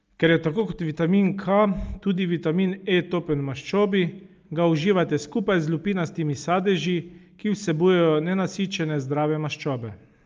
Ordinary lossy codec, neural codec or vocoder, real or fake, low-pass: Opus, 24 kbps; none; real; 7.2 kHz